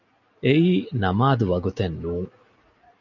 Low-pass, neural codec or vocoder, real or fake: 7.2 kHz; none; real